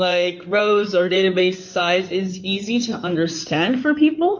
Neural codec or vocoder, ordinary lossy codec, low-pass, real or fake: codec, 16 kHz in and 24 kHz out, 2.2 kbps, FireRedTTS-2 codec; MP3, 48 kbps; 7.2 kHz; fake